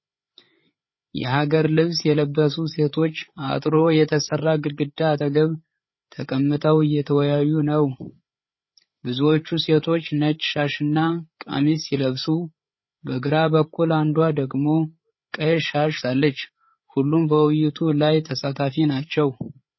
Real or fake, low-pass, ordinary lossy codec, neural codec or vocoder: fake; 7.2 kHz; MP3, 24 kbps; codec, 16 kHz, 8 kbps, FreqCodec, larger model